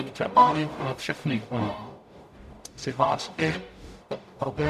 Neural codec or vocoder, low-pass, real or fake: codec, 44.1 kHz, 0.9 kbps, DAC; 14.4 kHz; fake